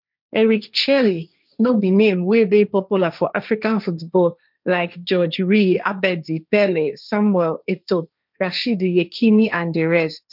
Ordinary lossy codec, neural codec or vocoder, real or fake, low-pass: none; codec, 16 kHz, 1.1 kbps, Voila-Tokenizer; fake; 5.4 kHz